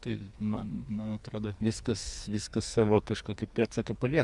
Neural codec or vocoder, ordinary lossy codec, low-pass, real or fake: codec, 44.1 kHz, 2.6 kbps, SNAC; Opus, 64 kbps; 10.8 kHz; fake